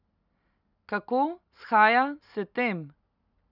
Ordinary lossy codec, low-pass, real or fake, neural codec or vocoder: none; 5.4 kHz; real; none